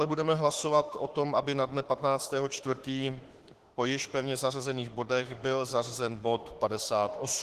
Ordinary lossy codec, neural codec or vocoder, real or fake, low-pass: Opus, 16 kbps; autoencoder, 48 kHz, 32 numbers a frame, DAC-VAE, trained on Japanese speech; fake; 14.4 kHz